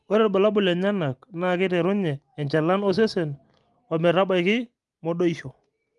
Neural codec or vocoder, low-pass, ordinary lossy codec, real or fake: none; 10.8 kHz; Opus, 32 kbps; real